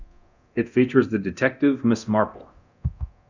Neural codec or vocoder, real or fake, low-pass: codec, 24 kHz, 0.9 kbps, DualCodec; fake; 7.2 kHz